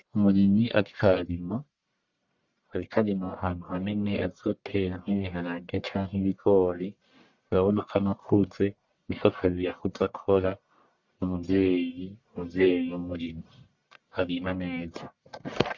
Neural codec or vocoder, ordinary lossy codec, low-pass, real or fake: codec, 44.1 kHz, 1.7 kbps, Pupu-Codec; Opus, 64 kbps; 7.2 kHz; fake